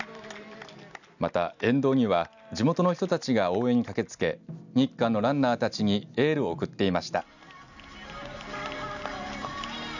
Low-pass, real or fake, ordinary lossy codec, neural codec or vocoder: 7.2 kHz; real; none; none